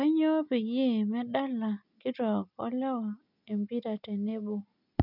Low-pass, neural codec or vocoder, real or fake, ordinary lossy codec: 5.4 kHz; none; real; none